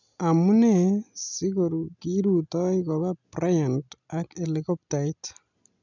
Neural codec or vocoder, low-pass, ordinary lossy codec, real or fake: none; 7.2 kHz; none; real